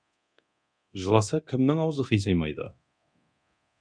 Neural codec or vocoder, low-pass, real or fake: codec, 24 kHz, 0.9 kbps, DualCodec; 9.9 kHz; fake